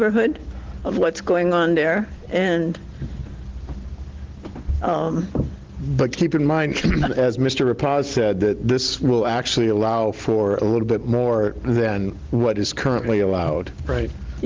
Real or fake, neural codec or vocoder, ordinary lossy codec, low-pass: real; none; Opus, 16 kbps; 7.2 kHz